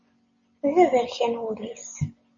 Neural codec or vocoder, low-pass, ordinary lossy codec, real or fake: none; 7.2 kHz; MP3, 32 kbps; real